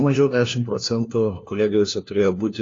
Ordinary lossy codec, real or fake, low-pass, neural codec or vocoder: AAC, 32 kbps; fake; 7.2 kHz; codec, 16 kHz, 1 kbps, FunCodec, trained on LibriTTS, 50 frames a second